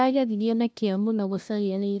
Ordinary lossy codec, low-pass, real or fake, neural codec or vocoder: none; none; fake; codec, 16 kHz, 0.5 kbps, FunCodec, trained on LibriTTS, 25 frames a second